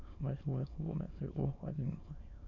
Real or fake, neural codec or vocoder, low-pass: fake; autoencoder, 22.05 kHz, a latent of 192 numbers a frame, VITS, trained on many speakers; 7.2 kHz